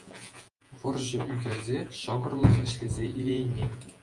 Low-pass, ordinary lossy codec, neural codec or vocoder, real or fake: 10.8 kHz; Opus, 32 kbps; vocoder, 48 kHz, 128 mel bands, Vocos; fake